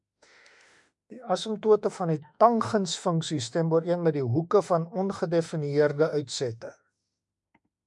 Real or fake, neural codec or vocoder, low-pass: fake; autoencoder, 48 kHz, 32 numbers a frame, DAC-VAE, trained on Japanese speech; 10.8 kHz